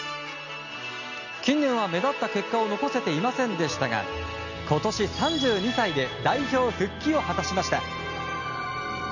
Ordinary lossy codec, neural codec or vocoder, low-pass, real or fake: none; none; 7.2 kHz; real